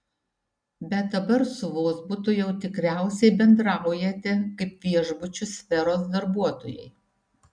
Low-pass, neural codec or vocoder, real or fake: 9.9 kHz; none; real